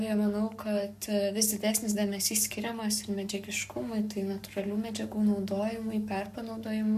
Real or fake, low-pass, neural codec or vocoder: fake; 14.4 kHz; codec, 44.1 kHz, 7.8 kbps, Pupu-Codec